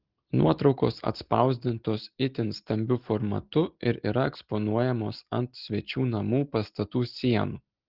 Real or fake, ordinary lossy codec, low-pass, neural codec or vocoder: real; Opus, 16 kbps; 5.4 kHz; none